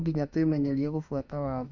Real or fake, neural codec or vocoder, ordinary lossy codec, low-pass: fake; autoencoder, 48 kHz, 32 numbers a frame, DAC-VAE, trained on Japanese speech; none; 7.2 kHz